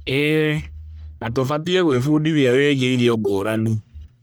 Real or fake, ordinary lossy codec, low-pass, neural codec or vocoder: fake; none; none; codec, 44.1 kHz, 1.7 kbps, Pupu-Codec